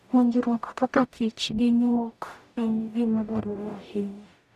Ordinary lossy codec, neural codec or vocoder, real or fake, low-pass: none; codec, 44.1 kHz, 0.9 kbps, DAC; fake; 14.4 kHz